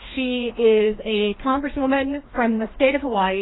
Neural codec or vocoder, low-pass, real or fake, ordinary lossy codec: codec, 16 kHz, 1 kbps, FreqCodec, larger model; 7.2 kHz; fake; AAC, 16 kbps